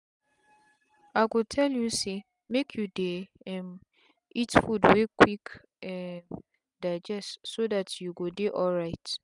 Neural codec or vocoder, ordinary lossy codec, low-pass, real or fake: none; none; 10.8 kHz; real